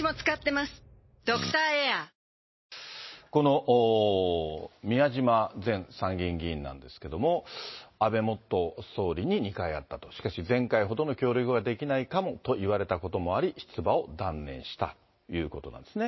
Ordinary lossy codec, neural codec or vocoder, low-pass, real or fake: MP3, 24 kbps; none; 7.2 kHz; real